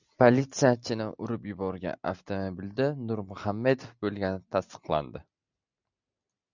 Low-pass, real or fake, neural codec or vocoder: 7.2 kHz; real; none